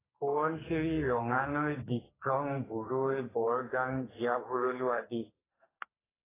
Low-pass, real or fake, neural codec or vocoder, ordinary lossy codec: 3.6 kHz; fake; codec, 44.1 kHz, 2.6 kbps, SNAC; AAC, 16 kbps